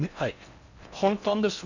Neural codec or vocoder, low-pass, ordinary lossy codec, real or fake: codec, 16 kHz in and 24 kHz out, 0.6 kbps, FocalCodec, streaming, 4096 codes; 7.2 kHz; none; fake